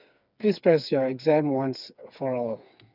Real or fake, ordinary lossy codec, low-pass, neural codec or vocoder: fake; none; 5.4 kHz; codec, 16 kHz, 4 kbps, FreqCodec, smaller model